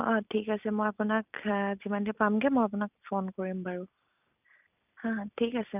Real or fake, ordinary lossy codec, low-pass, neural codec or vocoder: real; none; 3.6 kHz; none